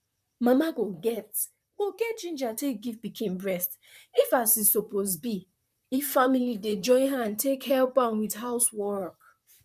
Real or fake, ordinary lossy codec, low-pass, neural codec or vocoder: fake; none; 14.4 kHz; vocoder, 44.1 kHz, 128 mel bands, Pupu-Vocoder